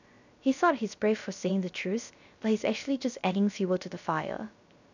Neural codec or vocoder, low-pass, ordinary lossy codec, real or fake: codec, 16 kHz, 0.3 kbps, FocalCodec; 7.2 kHz; none; fake